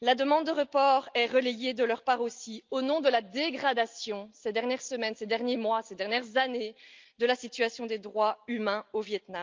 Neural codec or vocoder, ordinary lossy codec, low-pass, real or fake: none; Opus, 24 kbps; 7.2 kHz; real